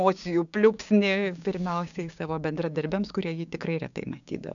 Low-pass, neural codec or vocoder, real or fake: 7.2 kHz; codec, 16 kHz, 6 kbps, DAC; fake